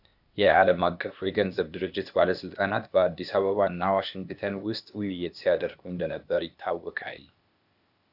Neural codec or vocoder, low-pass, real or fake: codec, 16 kHz, 0.8 kbps, ZipCodec; 5.4 kHz; fake